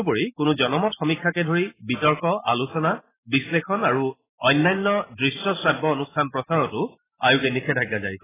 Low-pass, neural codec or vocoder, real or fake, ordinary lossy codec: 3.6 kHz; none; real; AAC, 16 kbps